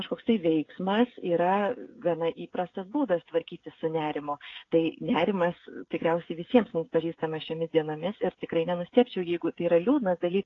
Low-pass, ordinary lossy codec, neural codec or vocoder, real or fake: 7.2 kHz; AAC, 32 kbps; codec, 16 kHz, 16 kbps, FreqCodec, smaller model; fake